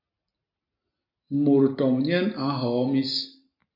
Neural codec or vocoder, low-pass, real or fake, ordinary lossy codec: none; 5.4 kHz; real; MP3, 32 kbps